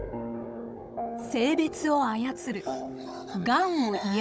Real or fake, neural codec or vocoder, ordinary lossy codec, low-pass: fake; codec, 16 kHz, 16 kbps, FunCodec, trained on Chinese and English, 50 frames a second; none; none